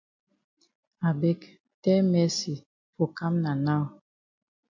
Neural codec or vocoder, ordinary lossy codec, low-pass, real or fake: none; MP3, 64 kbps; 7.2 kHz; real